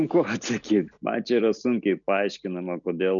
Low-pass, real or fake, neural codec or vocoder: 7.2 kHz; real; none